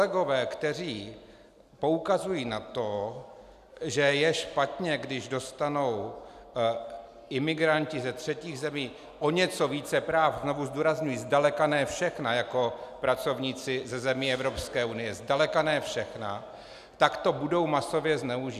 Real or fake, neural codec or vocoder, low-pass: real; none; 14.4 kHz